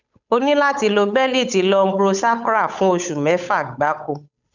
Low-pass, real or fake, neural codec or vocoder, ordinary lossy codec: 7.2 kHz; fake; codec, 16 kHz, 8 kbps, FunCodec, trained on Chinese and English, 25 frames a second; none